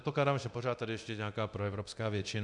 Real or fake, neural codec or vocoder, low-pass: fake; codec, 24 kHz, 0.9 kbps, DualCodec; 10.8 kHz